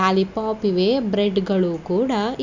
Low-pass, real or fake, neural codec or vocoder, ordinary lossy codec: 7.2 kHz; real; none; none